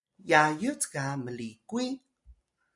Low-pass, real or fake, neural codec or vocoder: 10.8 kHz; real; none